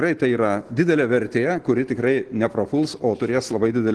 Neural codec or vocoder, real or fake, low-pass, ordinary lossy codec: none; real; 10.8 kHz; Opus, 16 kbps